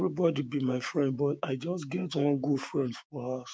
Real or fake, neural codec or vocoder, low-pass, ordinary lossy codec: fake; codec, 16 kHz, 6 kbps, DAC; none; none